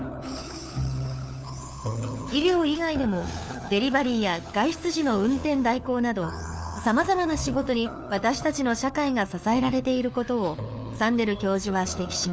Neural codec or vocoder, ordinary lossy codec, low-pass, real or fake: codec, 16 kHz, 4 kbps, FunCodec, trained on LibriTTS, 50 frames a second; none; none; fake